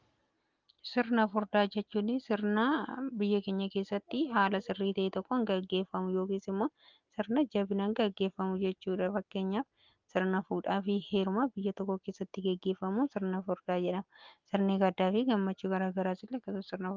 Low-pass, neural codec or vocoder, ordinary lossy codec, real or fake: 7.2 kHz; none; Opus, 32 kbps; real